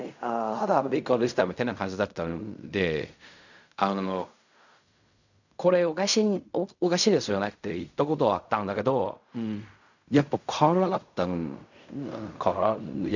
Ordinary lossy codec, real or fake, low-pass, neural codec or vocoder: none; fake; 7.2 kHz; codec, 16 kHz in and 24 kHz out, 0.4 kbps, LongCat-Audio-Codec, fine tuned four codebook decoder